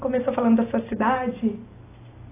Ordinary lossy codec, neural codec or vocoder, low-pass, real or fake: AAC, 16 kbps; none; 3.6 kHz; real